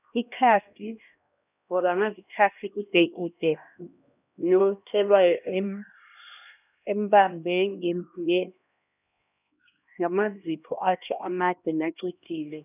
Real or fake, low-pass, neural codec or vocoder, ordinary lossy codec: fake; 3.6 kHz; codec, 16 kHz, 1 kbps, X-Codec, HuBERT features, trained on LibriSpeech; none